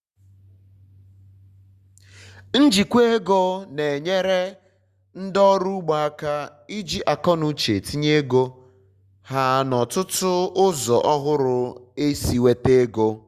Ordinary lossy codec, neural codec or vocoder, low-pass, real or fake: Opus, 64 kbps; none; 14.4 kHz; real